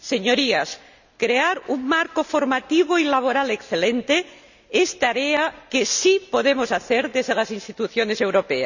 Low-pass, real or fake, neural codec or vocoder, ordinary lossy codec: 7.2 kHz; real; none; none